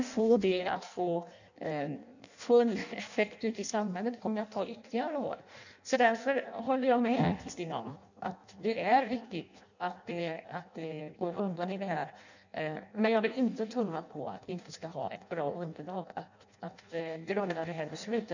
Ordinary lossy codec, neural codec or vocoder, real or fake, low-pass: none; codec, 16 kHz in and 24 kHz out, 0.6 kbps, FireRedTTS-2 codec; fake; 7.2 kHz